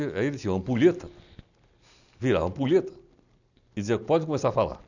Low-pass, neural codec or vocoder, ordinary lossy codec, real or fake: 7.2 kHz; none; none; real